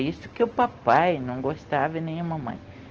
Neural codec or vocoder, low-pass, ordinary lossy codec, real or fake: none; 7.2 kHz; Opus, 16 kbps; real